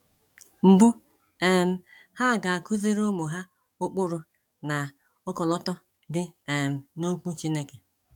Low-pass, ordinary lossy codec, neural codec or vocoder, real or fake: 19.8 kHz; none; codec, 44.1 kHz, 7.8 kbps, DAC; fake